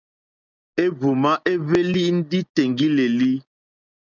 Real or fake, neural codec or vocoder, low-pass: real; none; 7.2 kHz